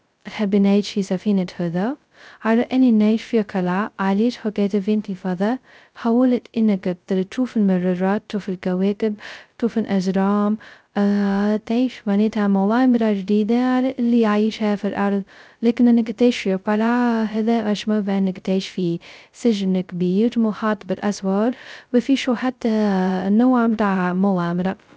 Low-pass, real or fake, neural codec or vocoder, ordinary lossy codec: none; fake; codec, 16 kHz, 0.2 kbps, FocalCodec; none